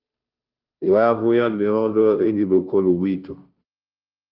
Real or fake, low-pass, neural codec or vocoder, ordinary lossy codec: fake; 5.4 kHz; codec, 16 kHz, 0.5 kbps, FunCodec, trained on Chinese and English, 25 frames a second; Opus, 24 kbps